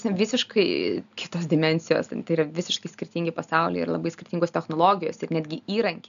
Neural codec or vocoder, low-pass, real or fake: none; 7.2 kHz; real